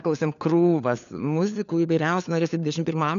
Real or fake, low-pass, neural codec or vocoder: fake; 7.2 kHz; codec, 16 kHz, 4 kbps, FunCodec, trained on LibriTTS, 50 frames a second